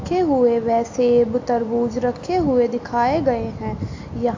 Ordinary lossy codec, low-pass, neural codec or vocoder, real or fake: AAC, 48 kbps; 7.2 kHz; none; real